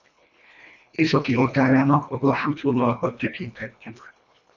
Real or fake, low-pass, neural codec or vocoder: fake; 7.2 kHz; codec, 24 kHz, 1.5 kbps, HILCodec